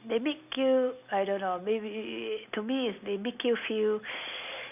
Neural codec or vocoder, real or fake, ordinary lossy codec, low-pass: none; real; none; 3.6 kHz